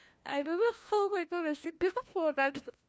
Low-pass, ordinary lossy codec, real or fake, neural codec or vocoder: none; none; fake; codec, 16 kHz, 1 kbps, FunCodec, trained on LibriTTS, 50 frames a second